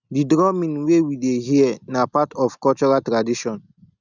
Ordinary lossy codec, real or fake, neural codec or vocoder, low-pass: none; real; none; 7.2 kHz